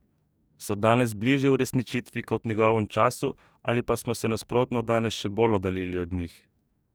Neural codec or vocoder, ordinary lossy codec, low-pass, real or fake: codec, 44.1 kHz, 2.6 kbps, DAC; none; none; fake